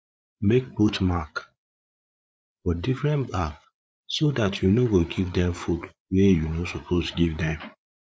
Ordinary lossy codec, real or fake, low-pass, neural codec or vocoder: none; fake; none; codec, 16 kHz, 8 kbps, FreqCodec, larger model